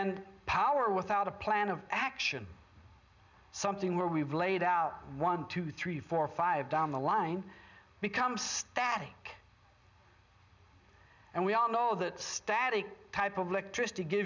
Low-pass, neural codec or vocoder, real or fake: 7.2 kHz; none; real